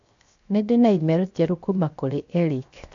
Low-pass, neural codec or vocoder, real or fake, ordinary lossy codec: 7.2 kHz; codec, 16 kHz, 0.7 kbps, FocalCodec; fake; AAC, 64 kbps